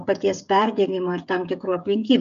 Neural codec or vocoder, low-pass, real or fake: codec, 16 kHz, 16 kbps, FreqCodec, smaller model; 7.2 kHz; fake